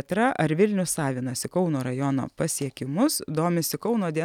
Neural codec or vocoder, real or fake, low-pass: none; real; 19.8 kHz